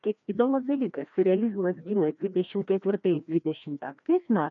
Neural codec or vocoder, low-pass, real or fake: codec, 16 kHz, 1 kbps, FreqCodec, larger model; 7.2 kHz; fake